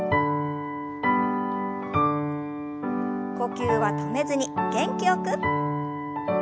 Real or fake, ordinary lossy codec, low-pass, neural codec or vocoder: real; none; none; none